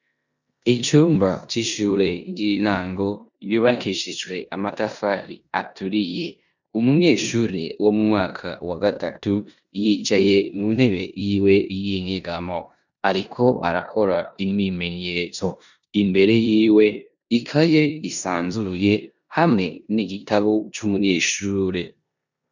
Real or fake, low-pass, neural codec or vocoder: fake; 7.2 kHz; codec, 16 kHz in and 24 kHz out, 0.9 kbps, LongCat-Audio-Codec, four codebook decoder